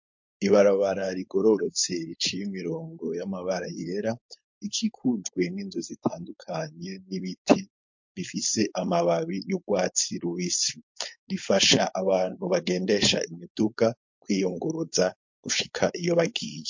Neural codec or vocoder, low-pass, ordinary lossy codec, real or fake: codec, 16 kHz, 4.8 kbps, FACodec; 7.2 kHz; MP3, 48 kbps; fake